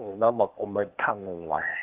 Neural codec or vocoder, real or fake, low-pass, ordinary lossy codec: codec, 16 kHz, 0.8 kbps, ZipCodec; fake; 3.6 kHz; Opus, 32 kbps